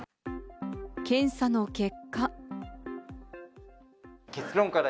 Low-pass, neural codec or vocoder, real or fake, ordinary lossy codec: none; none; real; none